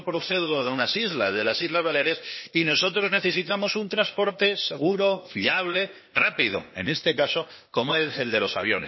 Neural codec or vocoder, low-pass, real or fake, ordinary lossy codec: codec, 16 kHz, 0.8 kbps, ZipCodec; 7.2 kHz; fake; MP3, 24 kbps